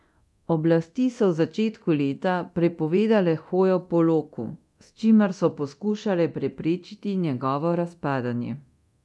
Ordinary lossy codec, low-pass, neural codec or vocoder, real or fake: none; none; codec, 24 kHz, 0.9 kbps, DualCodec; fake